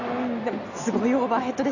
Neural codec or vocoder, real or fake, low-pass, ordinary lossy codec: none; real; 7.2 kHz; none